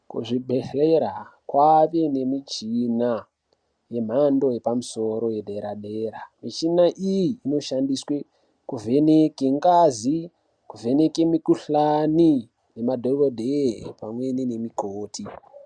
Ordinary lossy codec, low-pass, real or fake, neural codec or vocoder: MP3, 96 kbps; 9.9 kHz; real; none